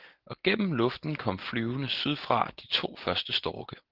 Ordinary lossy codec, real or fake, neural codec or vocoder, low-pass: Opus, 16 kbps; real; none; 5.4 kHz